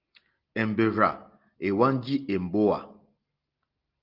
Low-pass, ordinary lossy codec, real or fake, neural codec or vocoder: 5.4 kHz; Opus, 24 kbps; real; none